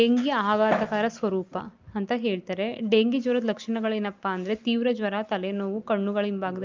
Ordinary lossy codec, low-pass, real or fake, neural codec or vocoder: Opus, 24 kbps; 7.2 kHz; real; none